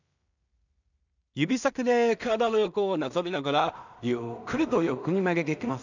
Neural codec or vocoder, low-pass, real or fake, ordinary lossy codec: codec, 16 kHz in and 24 kHz out, 0.4 kbps, LongCat-Audio-Codec, two codebook decoder; 7.2 kHz; fake; none